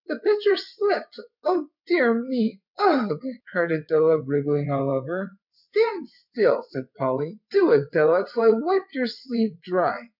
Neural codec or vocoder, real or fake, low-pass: vocoder, 22.05 kHz, 80 mel bands, WaveNeXt; fake; 5.4 kHz